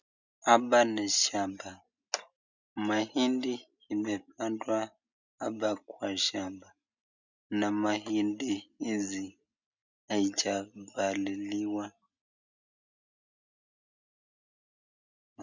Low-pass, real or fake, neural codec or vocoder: 7.2 kHz; real; none